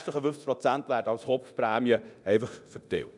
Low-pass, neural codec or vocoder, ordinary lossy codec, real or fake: 10.8 kHz; codec, 24 kHz, 0.9 kbps, DualCodec; none; fake